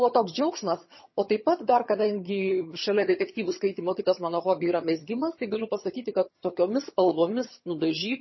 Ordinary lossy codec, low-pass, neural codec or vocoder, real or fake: MP3, 24 kbps; 7.2 kHz; vocoder, 22.05 kHz, 80 mel bands, HiFi-GAN; fake